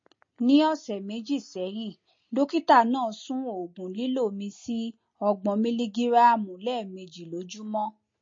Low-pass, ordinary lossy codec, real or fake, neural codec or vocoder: 7.2 kHz; MP3, 32 kbps; real; none